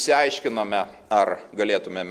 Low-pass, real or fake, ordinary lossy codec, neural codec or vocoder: 14.4 kHz; real; Opus, 24 kbps; none